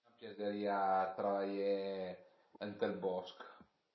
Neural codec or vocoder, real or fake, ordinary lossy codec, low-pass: none; real; MP3, 24 kbps; 7.2 kHz